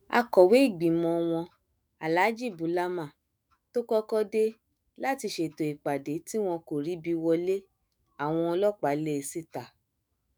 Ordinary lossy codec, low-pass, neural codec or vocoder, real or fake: none; none; autoencoder, 48 kHz, 128 numbers a frame, DAC-VAE, trained on Japanese speech; fake